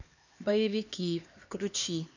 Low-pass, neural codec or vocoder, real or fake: 7.2 kHz; codec, 16 kHz, 2 kbps, X-Codec, HuBERT features, trained on LibriSpeech; fake